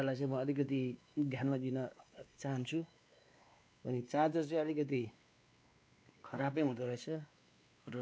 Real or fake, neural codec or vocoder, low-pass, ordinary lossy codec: fake; codec, 16 kHz, 2 kbps, X-Codec, WavLM features, trained on Multilingual LibriSpeech; none; none